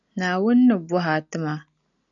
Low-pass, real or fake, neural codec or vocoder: 7.2 kHz; real; none